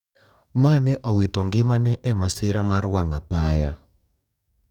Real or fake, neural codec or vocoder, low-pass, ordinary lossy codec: fake; codec, 44.1 kHz, 2.6 kbps, DAC; 19.8 kHz; none